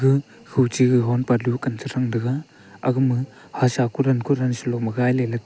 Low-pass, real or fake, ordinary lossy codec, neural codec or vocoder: none; real; none; none